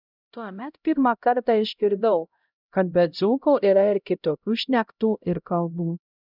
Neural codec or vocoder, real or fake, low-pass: codec, 16 kHz, 0.5 kbps, X-Codec, HuBERT features, trained on LibriSpeech; fake; 5.4 kHz